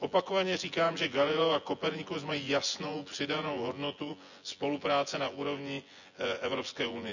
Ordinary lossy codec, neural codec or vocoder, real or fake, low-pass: none; vocoder, 24 kHz, 100 mel bands, Vocos; fake; 7.2 kHz